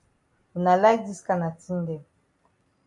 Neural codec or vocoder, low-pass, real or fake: none; 10.8 kHz; real